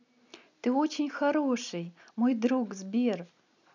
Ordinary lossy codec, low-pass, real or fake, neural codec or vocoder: none; 7.2 kHz; real; none